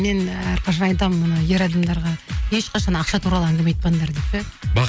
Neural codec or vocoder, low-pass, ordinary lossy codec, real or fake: none; none; none; real